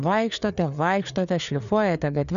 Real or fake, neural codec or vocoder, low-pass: fake; codec, 16 kHz, 4 kbps, FreqCodec, larger model; 7.2 kHz